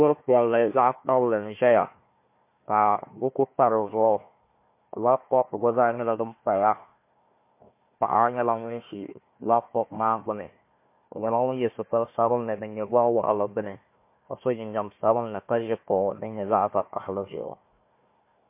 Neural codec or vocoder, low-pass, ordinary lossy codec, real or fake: codec, 16 kHz, 1 kbps, FunCodec, trained on Chinese and English, 50 frames a second; 3.6 kHz; MP3, 24 kbps; fake